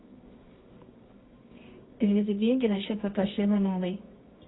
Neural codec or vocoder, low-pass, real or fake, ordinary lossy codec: codec, 24 kHz, 0.9 kbps, WavTokenizer, medium music audio release; 7.2 kHz; fake; AAC, 16 kbps